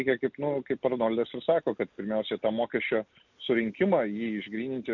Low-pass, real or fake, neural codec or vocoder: 7.2 kHz; real; none